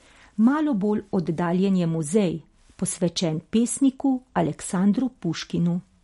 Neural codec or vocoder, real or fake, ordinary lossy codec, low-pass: none; real; MP3, 48 kbps; 19.8 kHz